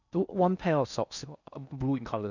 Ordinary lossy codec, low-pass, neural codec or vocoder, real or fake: none; 7.2 kHz; codec, 16 kHz in and 24 kHz out, 0.6 kbps, FocalCodec, streaming, 2048 codes; fake